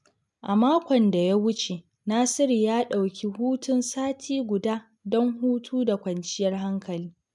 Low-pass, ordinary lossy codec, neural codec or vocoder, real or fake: 10.8 kHz; none; none; real